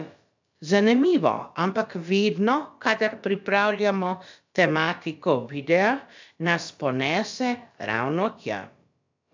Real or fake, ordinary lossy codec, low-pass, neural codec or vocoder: fake; MP3, 64 kbps; 7.2 kHz; codec, 16 kHz, about 1 kbps, DyCAST, with the encoder's durations